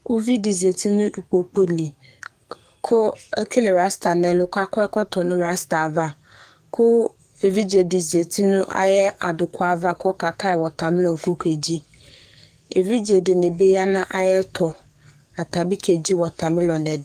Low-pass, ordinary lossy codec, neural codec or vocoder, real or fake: 14.4 kHz; Opus, 32 kbps; codec, 44.1 kHz, 2.6 kbps, SNAC; fake